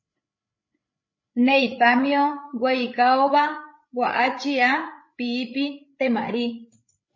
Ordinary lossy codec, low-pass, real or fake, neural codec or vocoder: MP3, 32 kbps; 7.2 kHz; fake; codec, 16 kHz, 8 kbps, FreqCodec, larger model